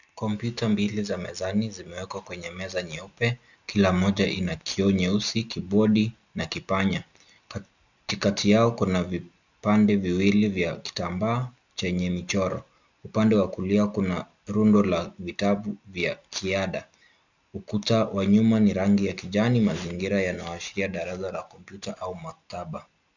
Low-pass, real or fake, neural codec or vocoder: 7.2 kHz; real; none